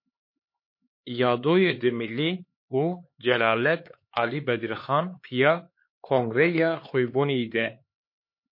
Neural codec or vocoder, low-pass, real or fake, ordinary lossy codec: codec, 16 kHz, 4 kbps, X-Codec, HuBERT features, trained on LibriSpeech; 5.4 kHz; fake; MP3, 32 kbps